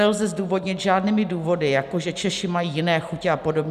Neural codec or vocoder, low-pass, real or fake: none; 14.4 kHz; real